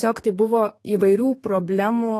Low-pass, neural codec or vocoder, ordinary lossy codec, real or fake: 14.4 kHz; codec, 32 kHz, 1.9 kbps, SNAC; AAC, 48 kbps; fake